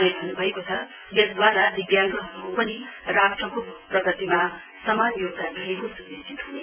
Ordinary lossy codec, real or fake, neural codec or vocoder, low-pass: none; fake; vocoder, 24 kHz, 100 mel bands, Vocos; 3.6 kHz